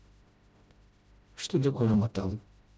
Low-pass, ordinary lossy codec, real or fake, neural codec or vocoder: none; none; fake; codec, 16 kHz, 0.5 kbps, FreqCodec, smaller model